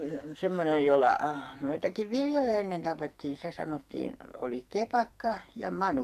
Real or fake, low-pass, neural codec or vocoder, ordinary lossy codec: fake; 14.4 kHz; codec, 44.1 kHz, 2.6 kbps, SNAC; AAC, 96 kbps